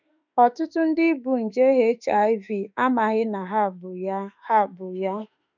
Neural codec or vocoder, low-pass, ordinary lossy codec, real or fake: autoencoder, 48 kHz, 32 numbers a frame, DAC-VAE, trained on Japanese speech; 7.2 kHz; none; fake